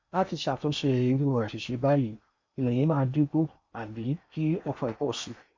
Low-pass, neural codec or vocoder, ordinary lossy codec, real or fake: 7.2 kHz; codec, 16 kHz in and 24 kHz out, 0.8 kbps, FocalCodec, streaming, 65536 codes; MP3, 48 kbps; fake